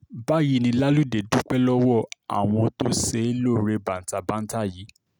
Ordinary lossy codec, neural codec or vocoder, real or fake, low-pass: none; none; real; none